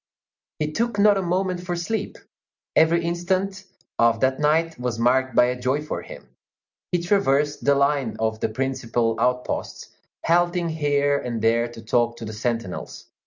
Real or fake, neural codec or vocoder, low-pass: real; none; 7.2 kHz